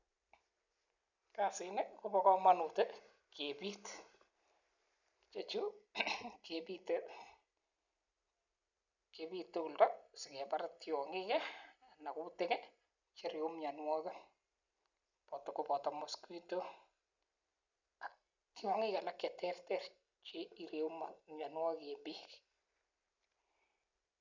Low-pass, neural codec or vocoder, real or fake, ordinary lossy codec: 7.2 kHz; none; real; none